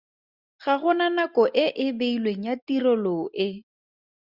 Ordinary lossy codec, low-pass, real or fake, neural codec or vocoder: Opus, 64 kbps; 5.4 kHz; real; none